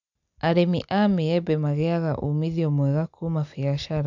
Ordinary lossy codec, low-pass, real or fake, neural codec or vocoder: none; 7.2 kHz; real; none